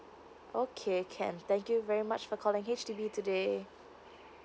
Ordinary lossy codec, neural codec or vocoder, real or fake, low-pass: none; none; real; none